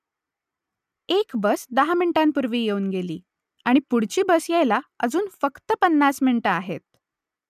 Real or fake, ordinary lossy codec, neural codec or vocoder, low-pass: real; none; none; 14.4 kHz